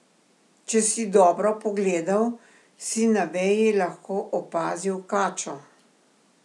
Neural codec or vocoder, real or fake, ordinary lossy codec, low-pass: none; real; none; none